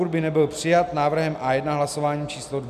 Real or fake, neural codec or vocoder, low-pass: real; none; 14.4 kHz